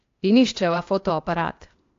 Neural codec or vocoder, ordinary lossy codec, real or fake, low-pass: codec, 16 kHz, 0.8 kbps, ZipCodec; AAC, 48 kbps; fake; 7.2 kHz